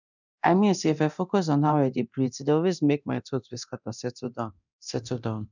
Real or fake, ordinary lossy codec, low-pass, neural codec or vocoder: fake; none; 7.2 kHz; codec, 24 kHz, 0.9 kbps, DualCodec